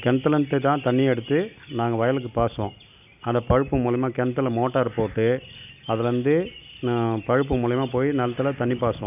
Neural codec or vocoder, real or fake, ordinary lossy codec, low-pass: none; real; none; 3.6 kHz